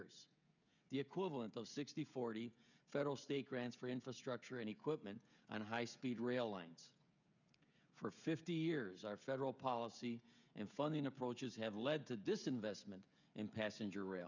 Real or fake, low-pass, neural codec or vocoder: fake; 7.2 kHz; codec, 16 kHz, 16 kbps, FreqCodec, smaller model